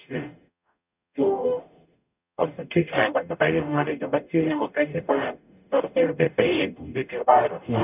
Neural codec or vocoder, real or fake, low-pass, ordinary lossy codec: codec, 44.1 kHz, 0.9 kbps, DAC; fake; 3.6 kHz; none